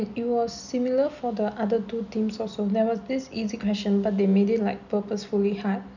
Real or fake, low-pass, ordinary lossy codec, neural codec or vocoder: real; 7.2 kHz; none; none